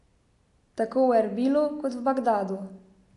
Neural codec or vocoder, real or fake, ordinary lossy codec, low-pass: none; real; AAC, 48 kbps; 10.8 kHz